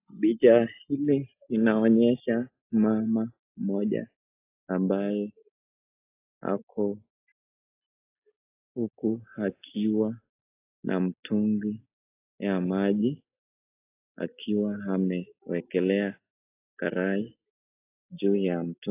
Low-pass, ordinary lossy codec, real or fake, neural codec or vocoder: 3.6 kHz; AAC, 24 kbps; real; none